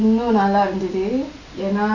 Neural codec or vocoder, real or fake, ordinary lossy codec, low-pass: none; real; none; 7.2 kHz